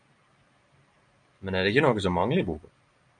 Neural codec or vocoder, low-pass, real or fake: none; 9.9 kHz; real